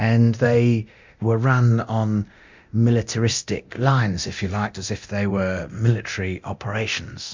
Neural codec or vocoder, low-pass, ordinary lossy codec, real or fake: codec, 24 kHz, 0.9 kbps, DualCodec; 7.2 kHz; MP3, 64 kbps; fake